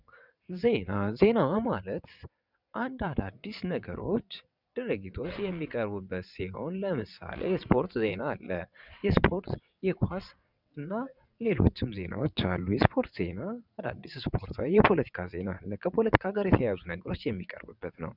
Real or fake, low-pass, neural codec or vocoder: fake; 5.4 kHz; vocoder, 22.05 kHz, 80 mel bands, WaveNeXt